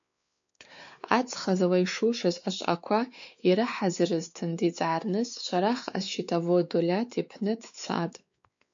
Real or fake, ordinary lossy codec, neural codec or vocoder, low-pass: fake; MP3, 64 kbps; codec, 16 kHz, 4 kbps, X-Codec, WavLM features, trained on Multilingual LibriSpeech; 7.2 kHz